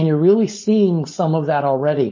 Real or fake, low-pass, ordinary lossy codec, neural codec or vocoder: fake; 7.2 kHz; MP3, 32 kbps; codec, 16 kHz, 4.8 kbps, FACodec